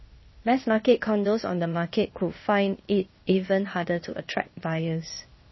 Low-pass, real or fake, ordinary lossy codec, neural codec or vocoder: 7.2 kHz; fake; MP3, 24 kbps; codec, 16 kHz, 0.8 kbps, ZipCodec